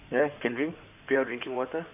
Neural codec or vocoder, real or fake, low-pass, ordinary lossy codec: codec, 16 kHz in and 24 kHz out, 2.2 kbps, FireRedTTS-2 codec; fake; 3.6 kHz; none